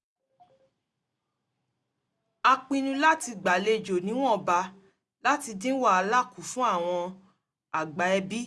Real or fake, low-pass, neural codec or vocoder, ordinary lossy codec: real; none; none; none